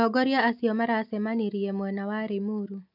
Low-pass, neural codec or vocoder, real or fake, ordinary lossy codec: 5.4 kHz; none; real; MP3, 48 kbps